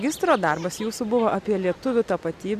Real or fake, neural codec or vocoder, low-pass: real; none; 14.4 kHz